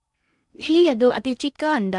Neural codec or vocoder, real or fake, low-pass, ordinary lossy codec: codec, 16 kHz in and 24 kHz out, 0.6 kbps, FocalCodec, streaming, 4096 codes; fake; 10.8 kHz; MP3, 96 kbps